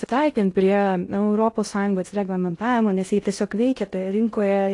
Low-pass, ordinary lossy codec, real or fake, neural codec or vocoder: 10.8 kHz; AAC, 48 kbps; fake; codec, 16 kHz in and 24 kHz out, 0.6 kbps, FocalCodec, streaming, 2048 codes